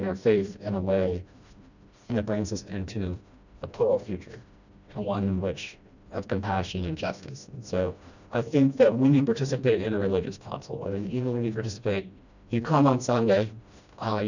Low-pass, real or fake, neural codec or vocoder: 7.2 kHz; fake; codec, 16 kHz, 1 kbps, FreqCodec, smaller model